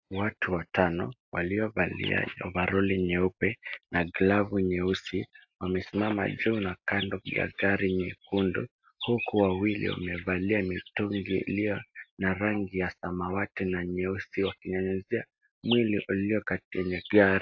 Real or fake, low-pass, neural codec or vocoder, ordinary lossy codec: real; 7.2 kHz; none; AAC, 48 kbps